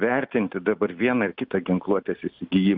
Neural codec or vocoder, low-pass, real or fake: none; 5.4 kHz; real